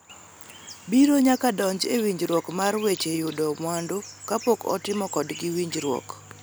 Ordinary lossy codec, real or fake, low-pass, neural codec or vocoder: none; real; none; none